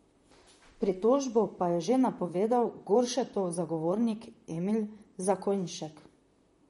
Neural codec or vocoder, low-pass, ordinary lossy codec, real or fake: vocoder, 44.1 kHz, 128 mel bands, Pupu-Vocoder; 19.8 kHz; MP3, 48 kbps; fake